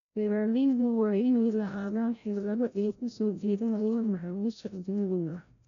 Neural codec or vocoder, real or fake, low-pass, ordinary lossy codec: codec, 16 kHz, 0.5 kbps, FreqCodec, larger model; fake; 7.2 kHz; none